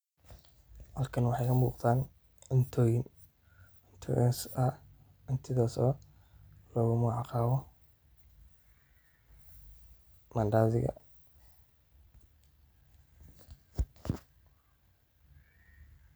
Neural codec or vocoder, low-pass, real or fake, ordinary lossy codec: none; none; real; none